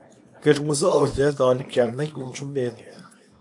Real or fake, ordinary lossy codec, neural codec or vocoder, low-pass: fake; AAC, 64 kbps; codec, 24 kHz, 0.9 kbps, WavTokenizer, small release; 10.8 kHz